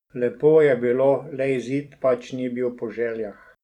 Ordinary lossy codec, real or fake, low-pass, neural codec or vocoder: none; fake; 19.8 kHz; vocoder, 44.1 kHz, 128 mel bands every 512 samples, BigVGAN v2